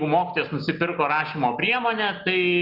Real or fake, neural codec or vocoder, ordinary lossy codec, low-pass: real; none; Opus, 24 kbps; 5.4 kHz